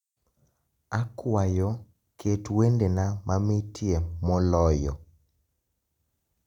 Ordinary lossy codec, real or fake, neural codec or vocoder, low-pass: none; real; none; 19.8 kHz